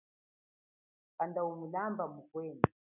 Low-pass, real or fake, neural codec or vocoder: 3.6 kHz; real; none